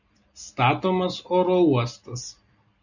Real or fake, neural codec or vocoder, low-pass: real; none; 7.2 kHz